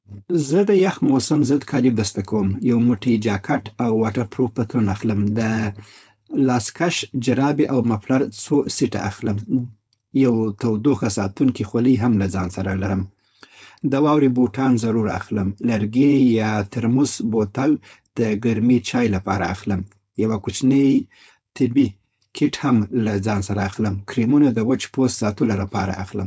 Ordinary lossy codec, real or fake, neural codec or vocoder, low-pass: none; fake; codec, 16 kHz, 4.8 kbps, FACodec; none